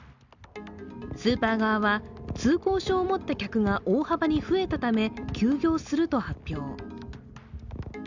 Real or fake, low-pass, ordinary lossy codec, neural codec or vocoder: real; 7.2 kHz; Opus, 64 kbps; none